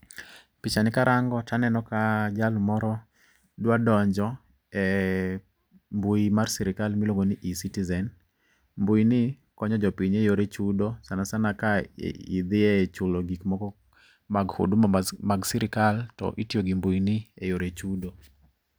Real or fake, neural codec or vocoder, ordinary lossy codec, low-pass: real; none; none; none